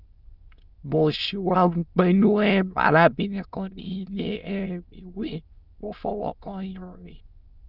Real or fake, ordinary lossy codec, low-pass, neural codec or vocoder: fake; Opus, 24 kbps; 5.4 kHz; autoencoder, 22.05 kHz, a latent of 192 numbers a frame, VITS, trained on many speakers